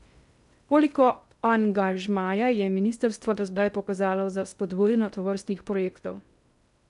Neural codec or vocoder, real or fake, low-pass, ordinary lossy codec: codec, 16 kHz in and 24 kHz out, 0.6 kbps, FocalCodec, streaming, 2048 codes; fake; 10.8 kHz; none